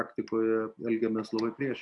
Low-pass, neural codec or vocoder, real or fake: 10.8 kHz; none; real